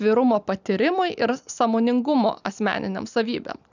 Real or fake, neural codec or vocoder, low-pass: real; none; 7.2 kHz